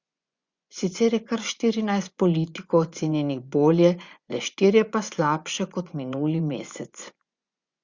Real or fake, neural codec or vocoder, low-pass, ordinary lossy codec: fake; vocoder, 24 kHz, 100 mel bands, Vocos; 7.2 kHz; Opus, 64 kbps